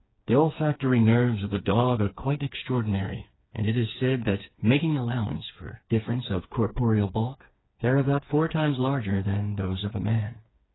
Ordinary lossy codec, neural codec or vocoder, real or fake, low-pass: AAC, 16 kbps; codec, 16 kHz, 4 kbps, FreqCodec, smaller model; fake; 7.2 kHz